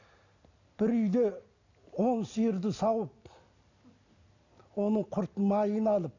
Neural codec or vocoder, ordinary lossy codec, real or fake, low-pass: none; none; real; 7.2 kHz